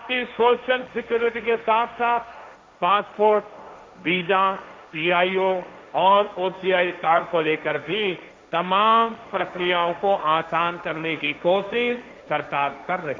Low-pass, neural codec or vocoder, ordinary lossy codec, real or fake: none; codec, 16 kHz, 1.1 kbps, Voila-Tokenizer; none; fake